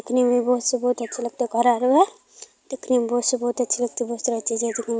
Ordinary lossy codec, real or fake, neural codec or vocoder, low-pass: none; real; none; none